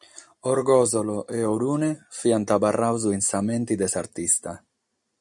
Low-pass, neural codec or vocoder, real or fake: 10.8 kHz; none; real